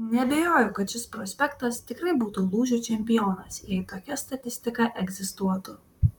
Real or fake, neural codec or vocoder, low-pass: fake; vocoder, 44.1 kHz, 128 mel bands, Pupu-Vocoder; 19.8 kHz